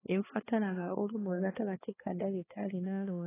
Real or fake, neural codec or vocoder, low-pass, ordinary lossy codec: fake; codec, 16 kHz, 2 kbps, X-Codec, HuBERT features, trained on balanced general audio; 3.6 kHz; AAC, 16 kbps